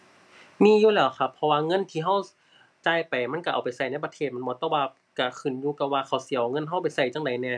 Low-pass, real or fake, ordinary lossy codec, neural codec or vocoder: none; real; none; none